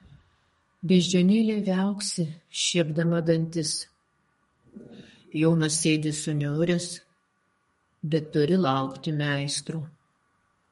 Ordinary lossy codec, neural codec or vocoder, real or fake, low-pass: MP3, 48 kbps; codec, 32 kHz, 1.9 kbps, SNAC; fake; 14.4 kHz